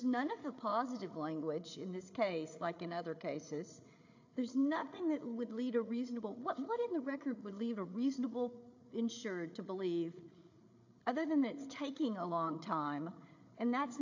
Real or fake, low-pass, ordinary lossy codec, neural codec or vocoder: fake; 7.2 kHz; AAC, 48 kbps; codec, 16 kHz, 8 kbps, FreqCodec, larger model